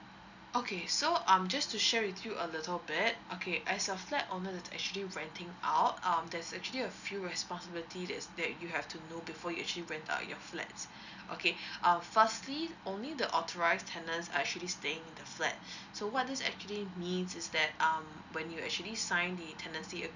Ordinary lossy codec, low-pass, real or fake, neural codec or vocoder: none; 7.2 kHz; real; none